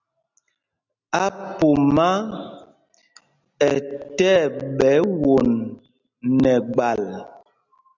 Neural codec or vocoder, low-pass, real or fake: none; 7.2 kHz; real